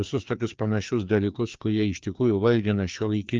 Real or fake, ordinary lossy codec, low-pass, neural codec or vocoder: fake; Opus, 32 kbps; 7.2 kHz; codec, 16 kHz, 2 kbps, FreqCodec, larger model